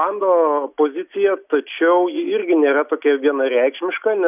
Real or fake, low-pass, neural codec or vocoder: real; 3.6 kHz; none